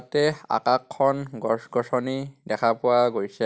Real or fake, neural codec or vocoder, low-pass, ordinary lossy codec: real; none; none; none